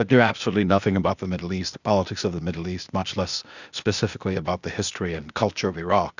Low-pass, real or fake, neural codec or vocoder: 7.2 kHz; fake; codec, 16 kHz, 0.8 kbps, ZipCodec